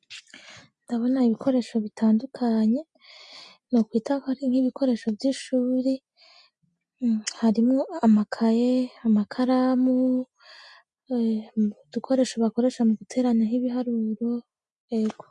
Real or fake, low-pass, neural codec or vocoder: real; 10.8 kHz; none